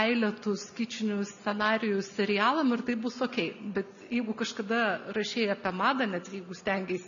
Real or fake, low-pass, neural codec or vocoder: real; 7.2 kHz; none